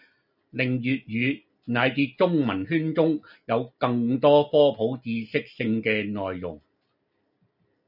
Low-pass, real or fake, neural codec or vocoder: 5.4 kHz; real; none